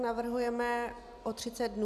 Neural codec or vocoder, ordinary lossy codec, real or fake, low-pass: none; AAC, 96 kbps; real; 14.4 kHz